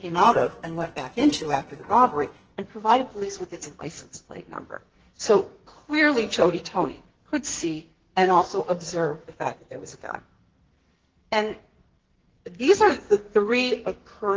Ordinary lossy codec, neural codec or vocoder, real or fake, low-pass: Opus, 16 kbps; codec, 44.1 kHz, 2.6 kbps, SNAC; fake; 7.2 kHz